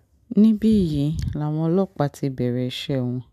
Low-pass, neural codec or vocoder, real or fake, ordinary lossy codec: 14.4 kHz; none; real; MP3, 96 kbps